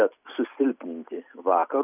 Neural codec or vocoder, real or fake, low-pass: none; real; 3.6 kHz